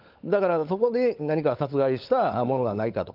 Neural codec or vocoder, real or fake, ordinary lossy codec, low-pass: codec, 16 kHz, 16 kbps, FunCodec, trained on LibriTTS, 50 frames a second; fake; Opus, 24 kbps; 5.4 kHz